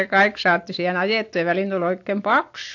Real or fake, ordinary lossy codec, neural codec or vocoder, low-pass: real; AAC, 48 kbps; none; 7.2 kHz